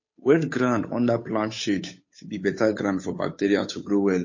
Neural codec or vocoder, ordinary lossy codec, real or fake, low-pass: codec, 16 kHz, 8 kbps, FunCodec, trained on Chinese and English, 25 frames a second; MP3, 32 kbps; fake; 7.2 kHz